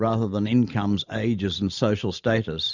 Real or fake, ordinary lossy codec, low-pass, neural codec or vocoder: fake; Opus, 64 kbps; 7.2 kHz; vocoder, 44.1 kHz, 128 mel bands every 512 samples, BigVGAN v2